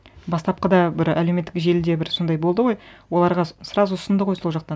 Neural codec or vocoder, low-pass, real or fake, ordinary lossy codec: none; none; real; none